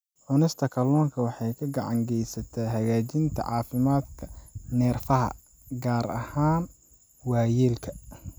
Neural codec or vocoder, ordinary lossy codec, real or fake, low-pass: none; none; real; none